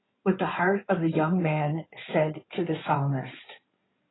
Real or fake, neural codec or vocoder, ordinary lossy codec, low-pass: fake; codec, 16 kHz in and 24 kHz out, 2.2 kbps, FireRedTTS-2 codec; AAC, 16 kbps; 7.2 kHz